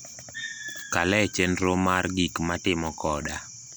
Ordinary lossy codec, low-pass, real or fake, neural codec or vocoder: none; none; real; none